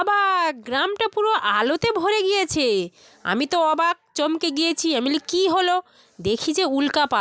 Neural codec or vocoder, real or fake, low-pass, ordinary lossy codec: none; real; none; none